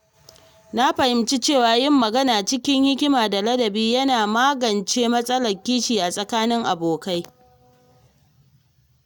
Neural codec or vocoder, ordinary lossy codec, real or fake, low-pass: none; none; real; 19.8 kHz